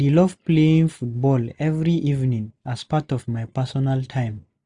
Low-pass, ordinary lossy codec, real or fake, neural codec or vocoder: 10.8 kHz; none; real; none